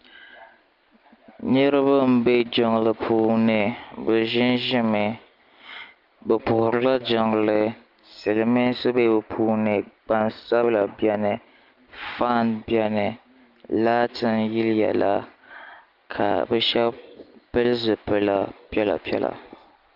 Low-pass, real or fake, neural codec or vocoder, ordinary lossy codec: 5.4 kHz; real; none; Opus, 32 kbps